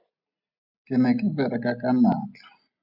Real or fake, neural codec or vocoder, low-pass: real; none; 5.4 kHz